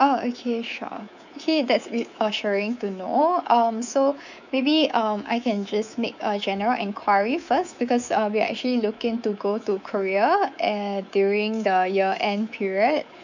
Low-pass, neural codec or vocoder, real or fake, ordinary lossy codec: 7.2 kHz; codec, 24 kHz, 3.1 kbps, DualCodec; fake; none